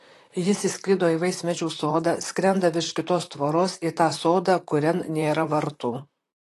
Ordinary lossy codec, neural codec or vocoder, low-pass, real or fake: AAC, 48 kbps; vocoder, 44.1 kHz, 128 mel bands, Pupu-Vocoder; 14.4 kHz; fake